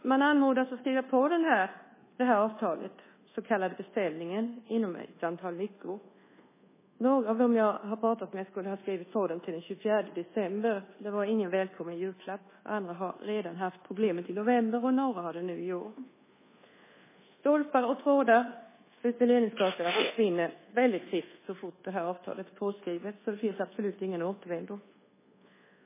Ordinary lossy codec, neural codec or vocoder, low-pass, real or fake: MP3, 16 kbps; codec, 24 kHz, 1.2 kbps, DualCodec; 3.6 kHz; fake